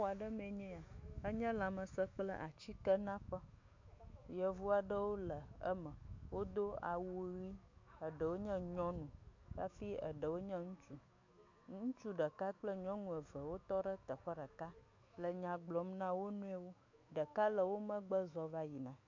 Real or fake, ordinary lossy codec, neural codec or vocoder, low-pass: fake; MP3, 48 kbps; autoencoder, 48 kHz, 128 numbers a frame, DAC-VAE, trained on Japanese speech; 7.2 kHz